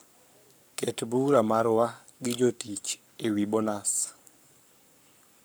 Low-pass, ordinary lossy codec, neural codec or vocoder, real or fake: none; none; codec, 44.1 kHz, 7.8 kbps, Pupu-Codec; fake